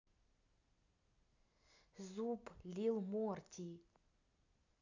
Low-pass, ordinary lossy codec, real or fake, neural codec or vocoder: 7.2 kHz; none; real; none